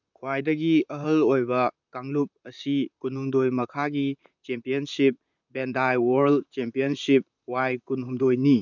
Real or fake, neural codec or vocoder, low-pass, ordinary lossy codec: fake; vocoder, 44.1 kHz, 128 mel bands, Pupu-Vocoder; 7.2 kHz; none